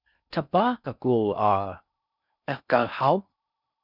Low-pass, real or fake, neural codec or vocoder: 5.4 kHz; fake; codec, 16 kHz in and 24 kHz out, 0.6 kbps, FocalCodec, streaming, 4096 codes